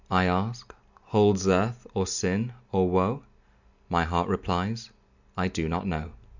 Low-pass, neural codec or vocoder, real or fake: 7.2 kHz; none; real